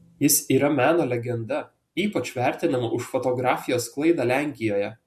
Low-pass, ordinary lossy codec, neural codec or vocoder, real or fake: 14.4 kHz; MP3, 64 kbps; none; real